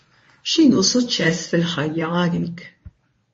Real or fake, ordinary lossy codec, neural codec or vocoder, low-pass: fake; MP3, 32 kbps; codec, 24 kHz, 0.9 kbps, WavTokenizer, medium speech release version 1; 10.8 kHz